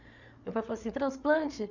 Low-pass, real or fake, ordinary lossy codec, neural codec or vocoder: 7.2 kHz; fake; none; codec, 16 kHz, 8 kbps, FreqCodec, larger model